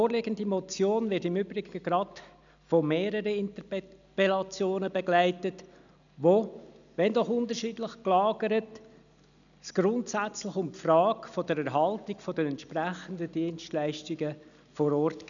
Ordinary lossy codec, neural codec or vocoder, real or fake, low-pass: none; none; real; 7.2 kHz